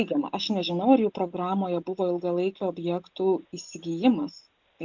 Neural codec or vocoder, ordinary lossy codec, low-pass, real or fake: none; MP3, 64 kbps; 7.2 kHz; real